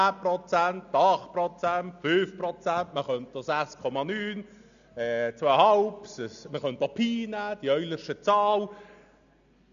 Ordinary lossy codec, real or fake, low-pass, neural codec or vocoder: none; real; 7.2 kHz; none